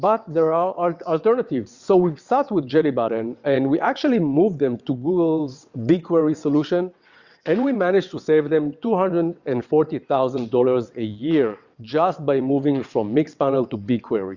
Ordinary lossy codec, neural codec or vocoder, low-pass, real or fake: Opus, 64 kbps; vocoder, 22.05 kHz, 80 mel bands, WaveNeXt; 7.2 kHz; fake